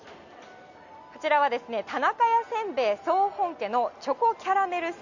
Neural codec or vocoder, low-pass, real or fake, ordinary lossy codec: none; 7.2 kHz; real; none